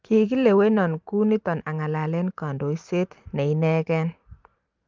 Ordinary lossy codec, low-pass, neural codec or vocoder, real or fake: Opus, 32 kbps; 7.2 kHz; vocoder, 44.1 kHz, 128 mel bands every 512 samples, BigVGAN v2; fake